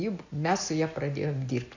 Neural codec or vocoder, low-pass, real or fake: none; 7.2 kHz; real